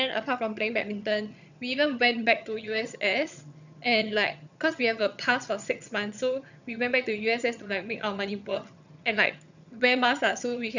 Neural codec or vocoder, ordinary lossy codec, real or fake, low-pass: vocoder, 22.05 kHz, 80 mel bands, HiFi-GAN; none; fake; 7.2 kHz